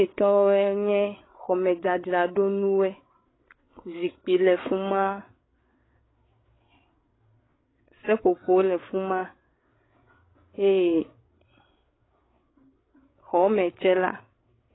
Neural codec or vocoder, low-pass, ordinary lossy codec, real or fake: codec, 16 kHz, 8 kbps, FreqCodec, larger model; 7.2 kHz; AAC, 16 kbps; fake